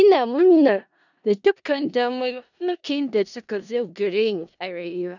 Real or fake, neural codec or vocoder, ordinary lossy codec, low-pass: fake; codec, 16 kHz in and 24 kHz out, 0.4 kbps, LongCat-Audio-Codec, four codebook decoder; none; 7.2 kHz